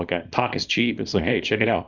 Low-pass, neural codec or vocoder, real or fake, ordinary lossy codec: 7.2 kHz; codec, 24 kHz, 0.9 kbps, WavTokenizer, small release; fake; Opus, 64 kbps